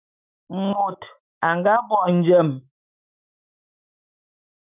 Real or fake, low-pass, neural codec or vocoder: fake; 3.6 kHz; autoencoder, 48 kHz, 128 numbers a frame, DAC-VAE, trained on Japanese speech